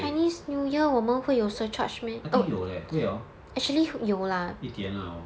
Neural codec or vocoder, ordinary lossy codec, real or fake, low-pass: none; none; real; none